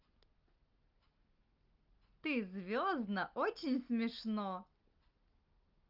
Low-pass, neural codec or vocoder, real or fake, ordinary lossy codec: 5.4 kHz; none; real; Opus, 32 kbps